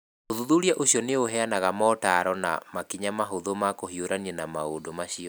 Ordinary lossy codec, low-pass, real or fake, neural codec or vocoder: none; none; real; none